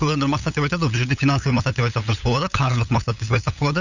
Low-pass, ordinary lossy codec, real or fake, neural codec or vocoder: 7.2 kHz; none; fake; codec, 16 kHz, 8 kbps, FunCodec, trained on LibriTTS, 25 frames a second